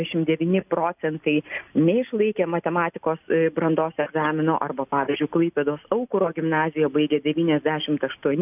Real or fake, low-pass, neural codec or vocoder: real; 3.6 kHz; none